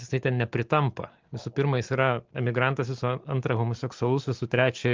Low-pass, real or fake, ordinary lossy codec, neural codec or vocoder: 7.2 kHz; real; Opus, 32 kbps; none